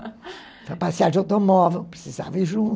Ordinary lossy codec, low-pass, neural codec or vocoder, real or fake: none; none; none; real